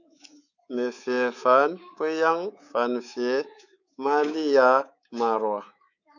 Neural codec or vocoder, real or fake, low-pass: codec, 24 kHz, 3.1 kbps, DualCodec; fake; 7.2 kHz